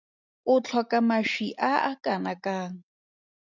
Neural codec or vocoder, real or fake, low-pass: none; real; 7.2 kHz